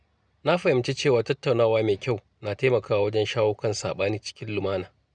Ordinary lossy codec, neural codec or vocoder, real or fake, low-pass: none; none; real; 9.9 kHz